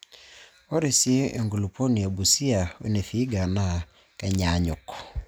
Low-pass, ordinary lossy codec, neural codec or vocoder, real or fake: none; none; none; real